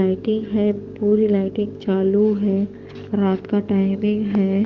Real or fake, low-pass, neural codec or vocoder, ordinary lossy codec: fake; 7.2 kHz; codec, 44.1 kHz, 7.8 kbps, DAC; Opus, 32 kbps